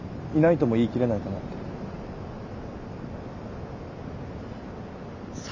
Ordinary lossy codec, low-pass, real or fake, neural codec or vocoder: none; 7.2 kHz; real; none